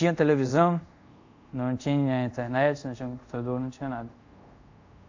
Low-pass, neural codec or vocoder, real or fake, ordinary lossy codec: 7.2 kHz; codec, 24 kHz, 0.5 kbps, DualCodec; fake; AAC, 48 kbps